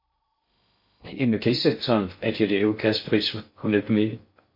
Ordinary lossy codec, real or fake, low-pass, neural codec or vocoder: MP3, 32 kbps; fake; 5.4 kHz; codec, 16 kHz in and 24 kHz out, 0.6 kbps, FocalCodec, streaming, 2048 codes